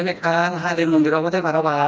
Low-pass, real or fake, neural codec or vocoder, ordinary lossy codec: none; fake; codec, 16 kHz, 1 kbps, FreqCodec, smaller model; none